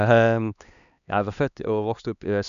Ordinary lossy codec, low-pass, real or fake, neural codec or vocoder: none; 7.2 kHz; fake; codec, 16 kHz, 2 kbps, X-Codec, HuBERT features, trained on LibriSpeech